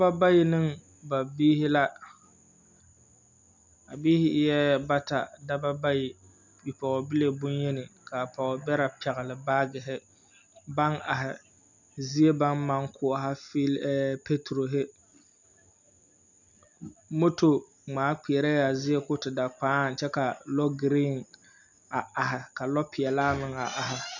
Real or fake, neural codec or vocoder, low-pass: real; none; 7.2 kHz